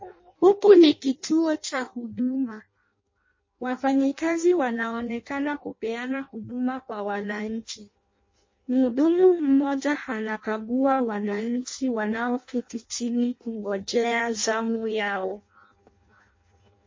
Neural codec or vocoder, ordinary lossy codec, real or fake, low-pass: codec, 16 kHz in and 24 kHz out, 0.6 kbps, FireRedTTS-2 codec; MP3, 32 kbps; fake; 7.2 kHz